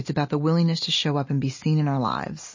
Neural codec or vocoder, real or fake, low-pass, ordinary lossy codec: none; real; 7.2 kHz; MP3, 32 kbps